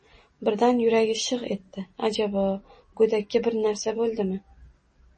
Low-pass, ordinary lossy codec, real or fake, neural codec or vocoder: 10.8 kHz; MP3, 32 kbps; real; none